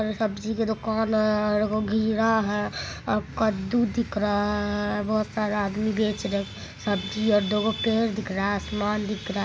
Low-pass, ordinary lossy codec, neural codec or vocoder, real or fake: none; none; none; real